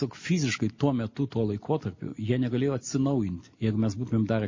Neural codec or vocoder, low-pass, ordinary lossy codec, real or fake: none; 7.2 kHz; MP3, 32 kbps; real